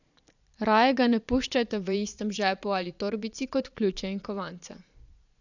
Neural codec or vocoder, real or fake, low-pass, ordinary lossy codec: vocoder, 22.05 kHz, 80 mel bands, Vocos; fake; 7.2 kHz; none